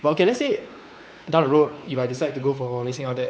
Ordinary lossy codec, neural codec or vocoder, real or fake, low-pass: none; codec, 16 kHz, 4 kbps, X-Codec, WavLM features, trained on Multilingual LibriSpeech; fake; none